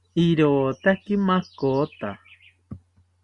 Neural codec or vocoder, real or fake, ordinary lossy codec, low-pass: none; real; Opus, 64 kbps; 10.8 kHz